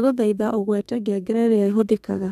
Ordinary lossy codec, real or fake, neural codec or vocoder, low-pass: none; fake; codec, 32 kHz, 1.9 kbps, SNAC; 14.4 kHz